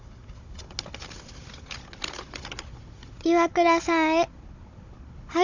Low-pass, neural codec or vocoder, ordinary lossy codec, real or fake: 7.2 kHz; codec, 16 kHz, 16 kbps, FunCodec, trained on Chinese and English, 50 frames a second; none; fake